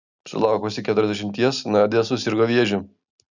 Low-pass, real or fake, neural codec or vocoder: 7.2 kHz; real; none